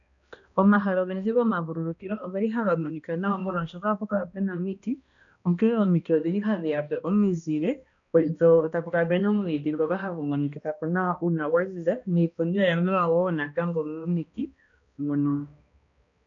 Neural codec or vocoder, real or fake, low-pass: codec, 16 kHz, 1 kbps, X-Codec, HuBERT features, trained on balanced general audio; fake; 7.2 kHz